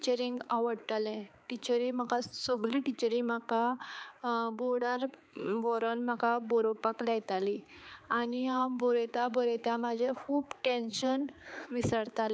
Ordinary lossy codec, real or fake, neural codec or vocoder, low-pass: none; fake; codec, 16 kHz, 4 kbps, X-Codec, HuBERT features, trained on balanced general audio; none